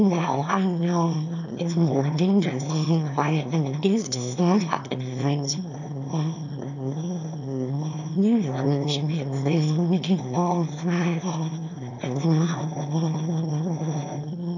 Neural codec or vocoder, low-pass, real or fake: autoencoder, 22.05 kHz, a latent of 192 numbers a frame, VITS, trained on one speaker; 7.2 kHz; fake